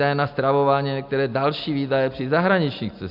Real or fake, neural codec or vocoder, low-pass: real; none; 5.4 kHz